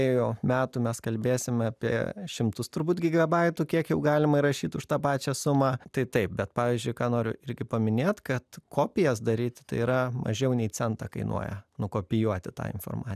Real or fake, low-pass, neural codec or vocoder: real; 14.4 kHz; none